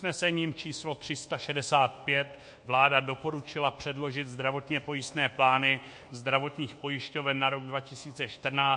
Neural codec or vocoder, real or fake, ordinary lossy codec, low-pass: codec, 24 kHz, 1.2 kbps, DualCodec; fake; MP3, 48 kbps; 10.8 kHz